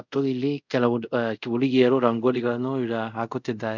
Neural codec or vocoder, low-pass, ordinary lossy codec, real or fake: codec, 24 kHz, 0.5 kbps, DualCodec; 7.2 kHz; none; fake